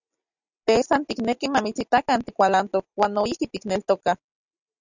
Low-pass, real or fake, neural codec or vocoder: 7.2 kHz; real; none